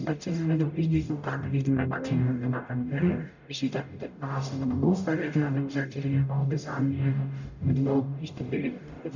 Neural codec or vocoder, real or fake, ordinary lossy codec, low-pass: codec, 44.1 kHz, 0.9 kbps, DAC; fake; none; 7.2 kHz